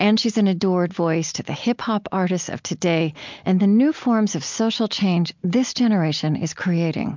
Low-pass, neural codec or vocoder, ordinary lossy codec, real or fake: 7.2 kHz; none; MP3, 64 kbps; real